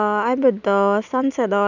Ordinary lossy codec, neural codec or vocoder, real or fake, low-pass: none; none; real; 7.2 kHz